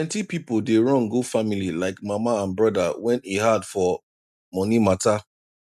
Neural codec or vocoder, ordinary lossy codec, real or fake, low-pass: none; none; real; 14.4 kHz